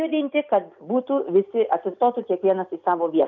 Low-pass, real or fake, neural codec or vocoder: 7.2 kHz; real; none